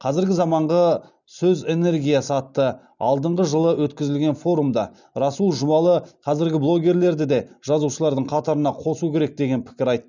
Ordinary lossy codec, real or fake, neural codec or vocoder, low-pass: none; real; none; 7.2 kHz